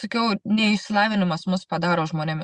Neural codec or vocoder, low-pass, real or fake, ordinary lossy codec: none; 10.8 kHz; real; Opus, 64 kbps